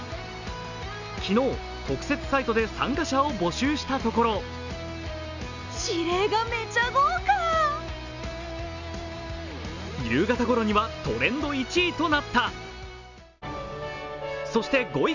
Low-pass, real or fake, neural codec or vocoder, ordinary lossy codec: 7.2 kHz; real; none; none